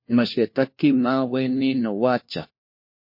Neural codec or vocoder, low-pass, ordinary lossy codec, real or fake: codec, 16 kHz, 1 kbps, FunCodec, trained on LibriTTS, 50 frames a second; 5.4 kHz; MP3, 32 kbps; fake